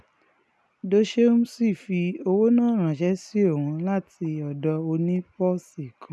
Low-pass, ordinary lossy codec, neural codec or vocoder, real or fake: none; none; none; real